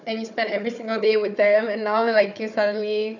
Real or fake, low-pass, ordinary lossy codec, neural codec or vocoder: fake; 7.2 kHz; none; codec, 16 kHz, 4 kbps, FunCodec, trained on Chinese and English, 50 frames a second